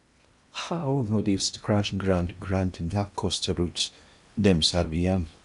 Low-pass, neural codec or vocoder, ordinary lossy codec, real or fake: 10.8 kHz; codec, 16 kHz in and 24 kHz out, 0.8 kbps, FocalCodec, streaming, 65536 codes; none; fake